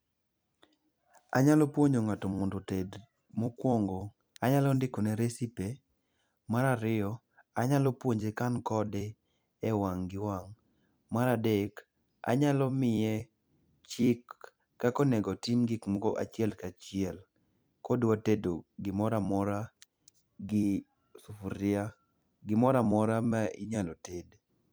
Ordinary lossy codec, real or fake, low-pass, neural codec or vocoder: none; fake; none; vocoder, 44.1 kHz, 128 mel bands every 256 samples, BigVGAN v2